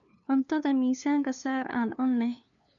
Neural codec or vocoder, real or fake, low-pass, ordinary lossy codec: codec, 16 kHz, 2 kbps, FunCodec, trained on LibriTTS, 25 frames a second; fake; 7.2 kHz; none